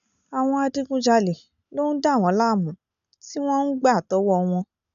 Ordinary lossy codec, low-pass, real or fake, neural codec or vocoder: none; 7.2 kHz; real; none